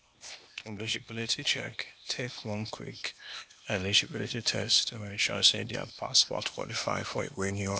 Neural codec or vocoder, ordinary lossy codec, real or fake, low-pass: codec, 16 kHz, 0.8 kbps, ZipCodec; none; fake; none